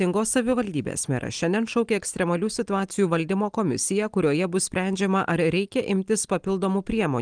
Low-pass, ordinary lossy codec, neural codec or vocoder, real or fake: 9.9 kHz; Opus, 24 kbps; none; real